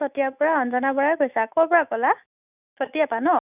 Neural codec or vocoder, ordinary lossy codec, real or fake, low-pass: autoencoder, 48 kHz, 128 numbers a frame, DAC-VAE, trained on Japanese speech; none; fake; 3.6 kHz